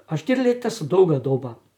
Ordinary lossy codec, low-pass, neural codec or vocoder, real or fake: none; 19.8 kHz; vocoder, 44.1 kHz, 128 mel bands, Pupu-Vocoder; fake